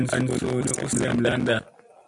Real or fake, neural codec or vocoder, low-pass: real; none; 10.8 kHz